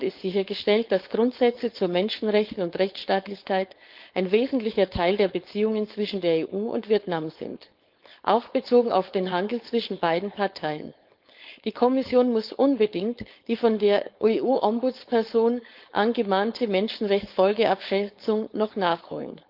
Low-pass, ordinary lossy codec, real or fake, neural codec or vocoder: 5.4 kHz; Opus, 32 kbps; fake; codec, 16 kHz, 4.8 kbps, FACodec